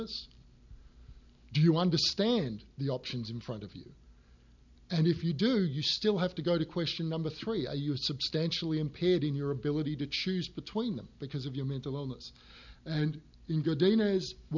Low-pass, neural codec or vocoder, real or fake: 7.2 kHz; none; real